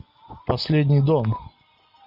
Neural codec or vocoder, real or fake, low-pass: none; real; 5.4 kHz